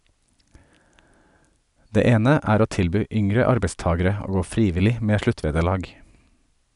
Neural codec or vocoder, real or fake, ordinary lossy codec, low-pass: none; real; none; 10.8 kHz